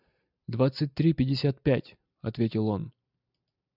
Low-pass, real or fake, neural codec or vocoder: 5.4 kHz; real; none